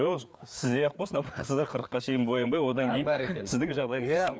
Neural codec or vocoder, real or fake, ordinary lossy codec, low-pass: codec, 16 kHz, 4 kbps, FreqCodec, larger model; fake; none; none